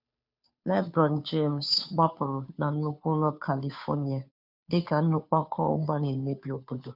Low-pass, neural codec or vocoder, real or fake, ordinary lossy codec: 5.4 kHz; codec, 16 kHz, 2 kbps, FunCodec, trained on Chinese and English, 25 frames a second; fake; none